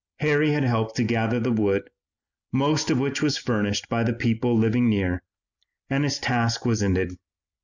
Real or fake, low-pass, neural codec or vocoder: real; 7.2 kHz; none